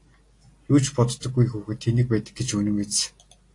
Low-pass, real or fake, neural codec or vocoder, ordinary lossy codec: 10.8 kHz; real; none; AAC, 48 kbps